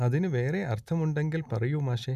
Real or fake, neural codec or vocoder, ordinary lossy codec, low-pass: real; none; none; 14.4 kHz